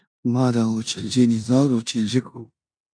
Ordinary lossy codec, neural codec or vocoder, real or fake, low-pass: AAC, 64 kbps; codec, 16 kHz in and 24 kHz out, 0.9 kbps, LongCat-Audio-Codec, four codebook decoder; fake; 9.9 kHz